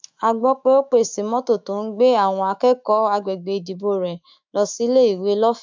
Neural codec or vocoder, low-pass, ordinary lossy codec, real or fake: autoencoder, 48 kHz, 128 numbers a frame, DAC-VAE, trained on Japanese speech; 7.2 kHz; MP3, 64 kbps; fake